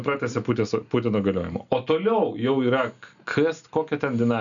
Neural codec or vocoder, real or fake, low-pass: none; real; 7.2 kHz